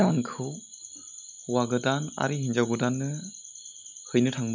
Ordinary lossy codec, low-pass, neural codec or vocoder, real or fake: none; 7.2 kHz; none; real